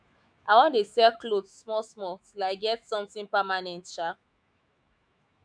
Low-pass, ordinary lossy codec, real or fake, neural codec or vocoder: 9.9 kHz; none; fake; autoencoder, 48 kHz, 128 numbers a frame, DAC-VAE, trained on Japanese speech